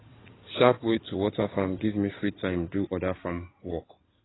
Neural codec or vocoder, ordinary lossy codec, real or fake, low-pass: codec, 16 kHz in and 24 kHz out, 2.2 kbps, FireRedTTS-2 codec; AAC, 16 kbps; fake; 7.2 kHz